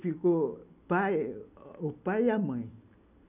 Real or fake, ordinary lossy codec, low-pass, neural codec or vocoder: real; none; 3.6 kHz; none